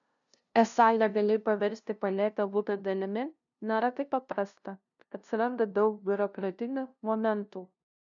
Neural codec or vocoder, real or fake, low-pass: codec, 16 kHz, 0.5 kbps, FunCodec, trained on LibriTTS, 25 frames a second; fake; 7.2 kHz